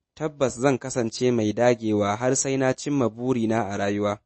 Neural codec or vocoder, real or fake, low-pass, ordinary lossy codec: none; real; 10.8 kHz; MP3, 32 kbps